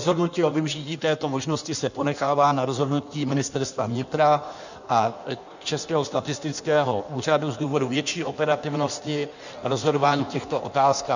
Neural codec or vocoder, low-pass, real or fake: codec, 16 kHz in and 24 kHz out, 1.1 kbps, FireRedTTS-2 codec; 7.2 kHz; fake